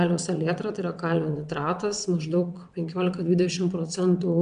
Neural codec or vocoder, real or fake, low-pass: vocoder, 22.05 kHz, 80 mel bands, WaveNeXt; fake; 9.9 kHz